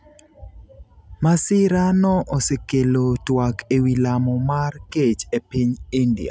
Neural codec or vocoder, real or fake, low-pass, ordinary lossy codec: none; real; none; none